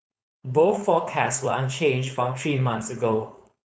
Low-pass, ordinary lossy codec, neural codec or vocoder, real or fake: none; none; codec, 16 kHz, 4.8 kbps, FACodec; fake